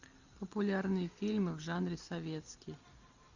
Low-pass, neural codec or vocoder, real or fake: 7.2 kHz; none; real